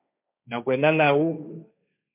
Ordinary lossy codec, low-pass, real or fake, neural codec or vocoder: MP3, 32 kbps; 3.6 kHz; fake; codec, 16 kHz, 1.1 kbps, Voila-Tokenizer